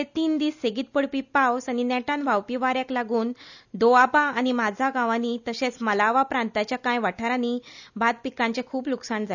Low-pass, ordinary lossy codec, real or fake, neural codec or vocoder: 7.2 kHz; none; real; none